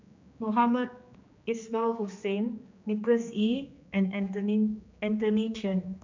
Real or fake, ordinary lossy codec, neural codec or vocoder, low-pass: fake; MP3, 64 kbps; codec, 16 kHz, 2 kbps, X-Codec, HuBERT features, trained on general audio; 7.2 kHz